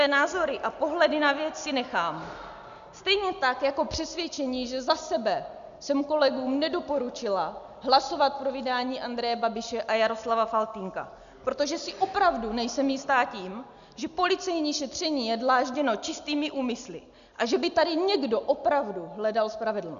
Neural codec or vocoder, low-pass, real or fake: none; 7.2 kHz; real